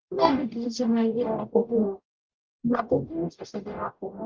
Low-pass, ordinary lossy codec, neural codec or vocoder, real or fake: 7.2 kHz; Opus, 24 kbps; codec, 44.1 kHz, 0.9 kbps, DAC; fake